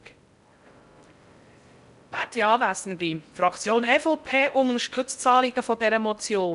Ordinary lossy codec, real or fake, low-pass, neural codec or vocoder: none; fake; 10.8 kHz; codec, 16 kHz in and 24 kHz out, 0.6 kbps, FocalCodec, streaming, 4096 codes